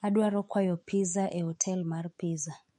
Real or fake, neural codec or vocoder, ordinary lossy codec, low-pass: fake; autoencoder, 48 kHz, 128 numbers a frame, DAC-VAE, trained on Japanese speech; MP3, 48 kbps; 19.8 kHz